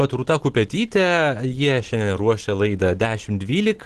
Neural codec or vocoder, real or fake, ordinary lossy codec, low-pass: none; real; Opus, 16 kbps; 10.8 kHz